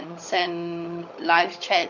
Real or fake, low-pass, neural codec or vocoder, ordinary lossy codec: fake; 7.2 kHz; codec, 16 kHz, 16 kbps, FunCodec, trained on LibriTTS, 50 frames a second; none